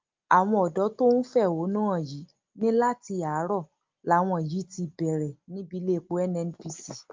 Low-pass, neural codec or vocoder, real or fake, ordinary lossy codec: 7.2 kHz; none; real; Opus, 24 kbps